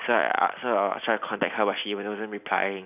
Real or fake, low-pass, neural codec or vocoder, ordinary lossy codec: real; 3.6 kHz; none; none